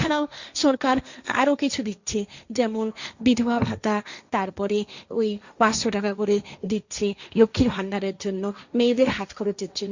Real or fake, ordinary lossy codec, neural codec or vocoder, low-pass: fake; Opus, 64 kbps; codec, 16 kHz, 1.1 kbps, Voila-Tokenizer; 7.2 kHz